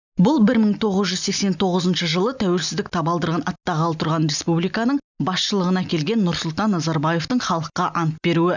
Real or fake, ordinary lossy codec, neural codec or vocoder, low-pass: real; none; none; 7.2 kHz